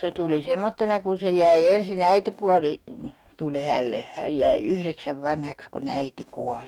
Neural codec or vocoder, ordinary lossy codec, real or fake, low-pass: codec, 44.1 kHz, 2.6 kbps, DAC; none; fake; 19.8 kHz